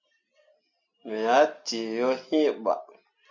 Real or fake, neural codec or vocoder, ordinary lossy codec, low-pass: real; none; MP3, 48 kbps; 7.2 kHz